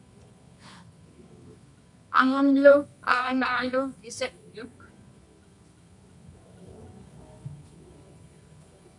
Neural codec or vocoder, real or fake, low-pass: codec, 24 kHz, 0.9 kbps, WavTokenizer, medium music audio release; fake; 10.8 kHz